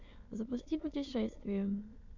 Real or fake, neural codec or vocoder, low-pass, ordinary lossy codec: fake; autoencoder, 22.05 kHz, a latent of 192 numbers a frame, VITS, trained on many speakers; 7.2 kHz; MP3, 64 kbps